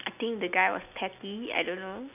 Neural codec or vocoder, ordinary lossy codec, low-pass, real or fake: none; none; 3.6 kHz; real